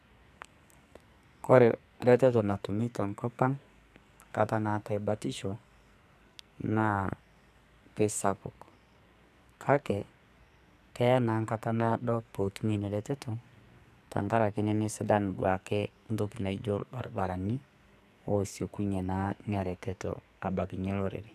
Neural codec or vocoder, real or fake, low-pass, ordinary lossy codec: codec, 44.1 kHz, 2.6 kbps, SNAC; fake; 14.4 kHz; none